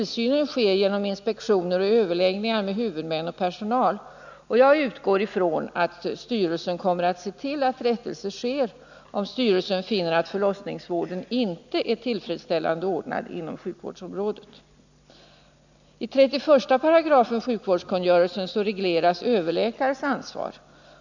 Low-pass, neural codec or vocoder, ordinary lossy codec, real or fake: 7.2 kHz; none; none; real